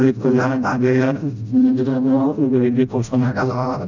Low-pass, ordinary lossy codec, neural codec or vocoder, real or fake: 7.2 kHz; none; codec, 16 kHz, 0.5 kbps, FreqCodec, smaller model; fake